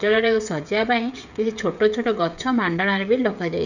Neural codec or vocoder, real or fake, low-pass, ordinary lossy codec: codec, 16 kHz, 16 kbps, FreqCodec, smaller model; fake; 7.2 kHz; none